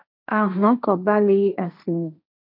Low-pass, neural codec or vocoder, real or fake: 5.4 kHz; codec, 16 kHz, 1.1 kbps, Voila-Tokenizer; fake